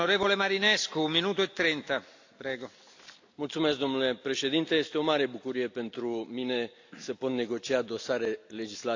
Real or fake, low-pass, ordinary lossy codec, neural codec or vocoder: real; 7.2 kHz; AAC, 48 kbps; none